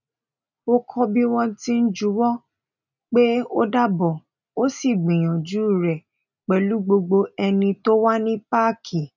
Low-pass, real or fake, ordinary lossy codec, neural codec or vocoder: 7.2 kHz; real; none; none